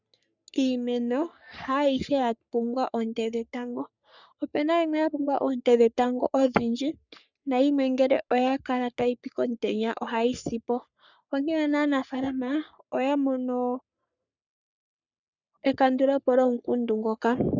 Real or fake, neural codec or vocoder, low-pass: fake; codec, 44.1 kHz, 3.4 kbps, Pupu-Codec; 7.2 kHz